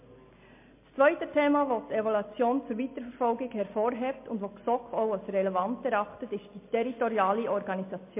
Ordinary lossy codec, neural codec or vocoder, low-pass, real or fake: MP3, 24 kbps; none; 3.6 kHz; real